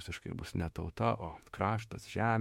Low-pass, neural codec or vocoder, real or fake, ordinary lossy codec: 19.8 kHz; autoencoder, 48 kHz, 32 numbers a frame, DAC-VAE, trained on Japanese speech; fake; MP3, 64 kbps